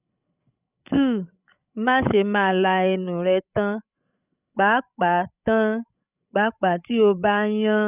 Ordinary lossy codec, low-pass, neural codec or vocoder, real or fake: none; 3.6 kHz; codec, 16 kHz, 16 kbps, FreqCodec, larger model; fake